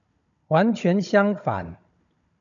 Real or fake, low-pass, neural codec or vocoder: fake; 7.2 kHz; codec, 16 kHz, 16 kbps, FunCodec, trained on Chinese and English, 50 frames a second